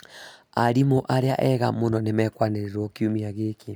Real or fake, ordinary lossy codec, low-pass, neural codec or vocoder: real; none; none; none